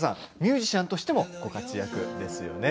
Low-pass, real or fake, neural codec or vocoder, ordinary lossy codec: none; real; none; none